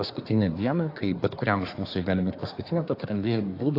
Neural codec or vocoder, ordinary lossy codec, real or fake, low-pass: codec, 24 kHz, 1 kbps, SNAC; AAC, 32 kbps; fake; 5.4 kHz